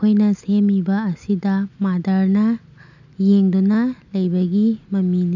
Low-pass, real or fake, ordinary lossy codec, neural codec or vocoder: 7.2 kHz; real; none; none